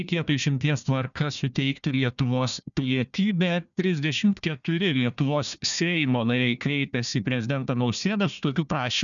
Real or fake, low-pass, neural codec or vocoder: fake; 7.2 kHz; codec, 16 kHz, 1 kbps, FunCodec, trained on Chinese and English, 50 frames a second